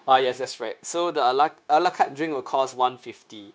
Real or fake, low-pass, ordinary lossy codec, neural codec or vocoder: fake; none; none; codec, 16 kHz, 0.9 kbps, LongCat-Audio-Codec